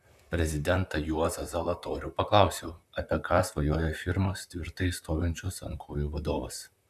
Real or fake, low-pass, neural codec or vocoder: fake; 14.4 kHz; vocoder, 44.1 kHz, 128 mel bands, Pupu-Vocoder